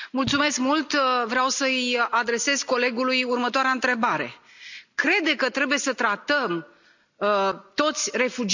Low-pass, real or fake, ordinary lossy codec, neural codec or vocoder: 7.2 kHz; real; none; none